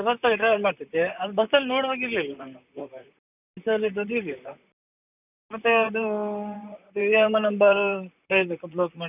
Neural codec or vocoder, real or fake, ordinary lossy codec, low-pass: vocoder, 44.1 kHz, 128 mel bands, Pupu-Vocoder; fake; none; 3.6 kHz